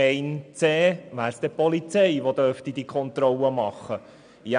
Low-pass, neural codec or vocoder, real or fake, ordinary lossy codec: 9.9 kHz; none; real; none